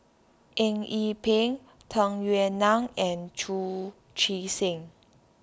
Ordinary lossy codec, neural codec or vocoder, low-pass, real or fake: none; none; none; real